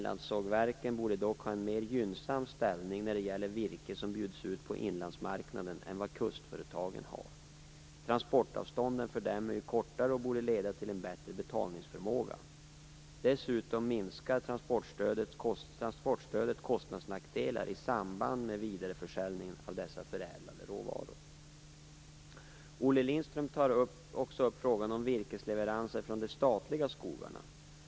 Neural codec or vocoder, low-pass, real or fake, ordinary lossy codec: none; none; real; none